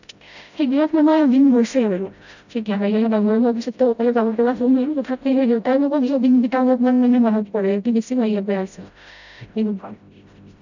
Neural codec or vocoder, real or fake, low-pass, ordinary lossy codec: codec, 16 kHz, 0.5 kbps, FreqCodec, smaller model; fake; 7.2 kHz; none